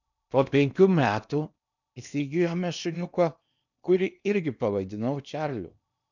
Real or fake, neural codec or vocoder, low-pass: fake; codec, 16 kHz in and 24 kHz out, 0.8 kbps, FocalCodec, streaming, 65536 codes; 7.2 kHz